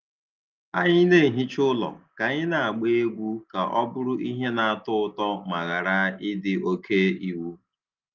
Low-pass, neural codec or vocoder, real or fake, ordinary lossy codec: 7.2 kHz; none; real; Opus, 24 kbps